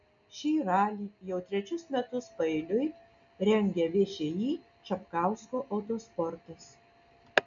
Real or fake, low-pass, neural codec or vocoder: real; 7.2 kHz; none